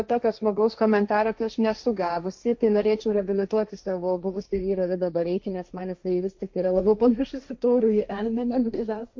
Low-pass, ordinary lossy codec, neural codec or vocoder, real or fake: 7.2 kHz; MP3, 48 kbps; codec, 16 kHz, 1.1 kbps, Voila-Tokenizer; fake